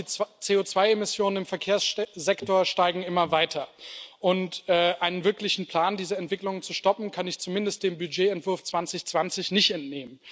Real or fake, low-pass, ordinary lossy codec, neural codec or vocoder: real; none; none; none